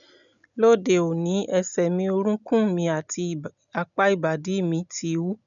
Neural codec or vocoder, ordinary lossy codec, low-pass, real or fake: none; none; 7.2 kHz; real